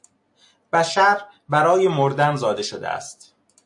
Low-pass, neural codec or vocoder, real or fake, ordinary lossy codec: 10.8 kHz; none; real; AAC, 64 kbps